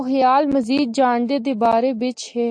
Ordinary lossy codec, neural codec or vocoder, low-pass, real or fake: AAC, 64 kbps; none; 9.9 kHz; real